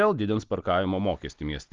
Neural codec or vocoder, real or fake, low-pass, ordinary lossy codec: none; real; 7.2 kHz; Opus, 32 kbps